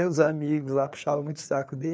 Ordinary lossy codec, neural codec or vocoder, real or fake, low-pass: none; codec, 16 kHz, 4 kbps, FreqCodec, larger model; fake; none